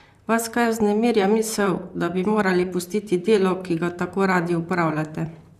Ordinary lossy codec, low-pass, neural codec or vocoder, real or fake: none; 14.4 kHz; vocoder, 44.1 kHz, 128 mel bands, Pupu-Vocoder; fake